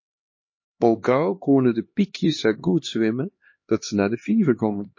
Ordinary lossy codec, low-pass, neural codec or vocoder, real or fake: MP3, 32 kbps; 7.2 kHz; codec, 16 kHz, 2 kbps, X-Codec, HuBERT features, trained on LibriSpeech; fake